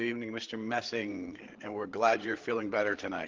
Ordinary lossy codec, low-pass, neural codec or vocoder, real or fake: Opus, 16 kbps; 7.2 kHz; codec, 16 kHz, 8 kbps, FreqCodec, larger model; fake